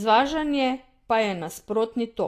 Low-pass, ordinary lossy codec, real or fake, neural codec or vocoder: 14.4 kHz; AAC, 48 kbps; real; none